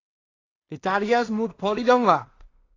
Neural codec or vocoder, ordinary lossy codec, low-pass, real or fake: codec, 16 kHz in and 24 kHz out, 0.4 kbps, LongCat-Audio-Codec, two codebook decoder; AAC, 32 kbps; 7.2 kHz; fake